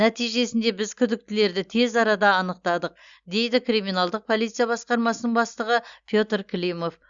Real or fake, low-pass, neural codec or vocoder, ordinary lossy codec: real; 7.2 kHz; none; Opus, 64 kbps